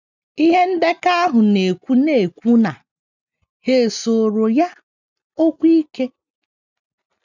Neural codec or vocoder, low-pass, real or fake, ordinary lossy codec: none; 7.2 kHz; real; none